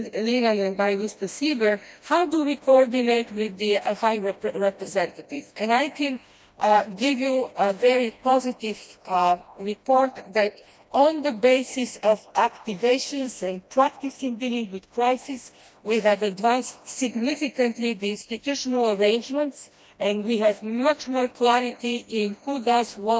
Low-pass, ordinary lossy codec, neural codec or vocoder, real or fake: none; none; codec, 16 kHz, 1 kbps, FreqCodec, smaller model; fake